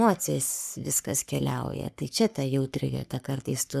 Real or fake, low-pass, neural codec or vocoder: fake; 14.4 kHz; codec, 44.1 kHz, 3.4 kbps, Pupu-Codec